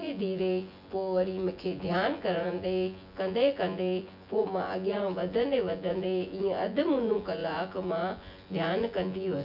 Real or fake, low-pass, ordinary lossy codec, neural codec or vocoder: fake; 5.4 kHz; none; vocoder, 24 kHz, 100 mel bands, Vocos